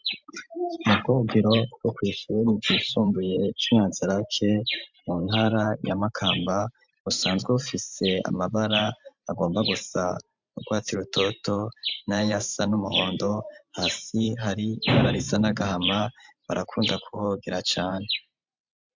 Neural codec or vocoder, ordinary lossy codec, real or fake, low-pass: vocoder, 44.1 kHz, 128 mel bands every 512 samples, BigVGAN v2; MP3, 64 kbps; fake; 7.2 kHz